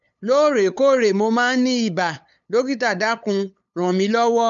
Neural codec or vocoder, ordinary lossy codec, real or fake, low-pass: codec, 16 kHz, 8 kbps, FunCodec, trained on LibriTTS, 25 frames a second; none; fake; 7.2 kHz